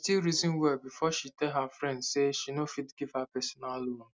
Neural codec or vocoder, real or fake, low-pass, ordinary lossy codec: none; real; none; none